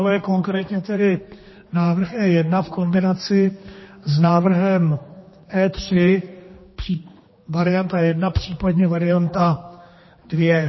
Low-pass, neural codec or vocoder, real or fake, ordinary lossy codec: 7.2 kHz; codec, 16 kHz, 2 kbps, X-Codec, HuBERT features, trained on general audio; fake; MP3, 24 kbps